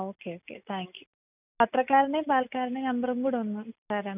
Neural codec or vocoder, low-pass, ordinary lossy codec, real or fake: none; 3.6 kHz; none; real